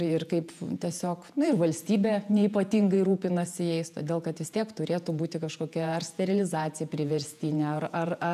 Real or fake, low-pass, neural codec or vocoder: fake; 14.4 kHz; vocoder, 48 kHz, 128 mel bands, Vocos